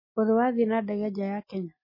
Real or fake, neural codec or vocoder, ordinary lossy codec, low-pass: real; none; MP3, 24 kbps; 5.4 kHz